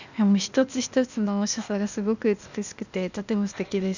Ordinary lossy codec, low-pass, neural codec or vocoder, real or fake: none; 7.2 kHz; codec, 16 kHz, 0.8 kbps, ZipCodec; fake